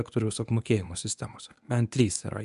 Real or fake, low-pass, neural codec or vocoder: fake; 10.8 kHz; codec, 24 kHz, 0.9 kbps, WavTokenizer, medium speech release version 1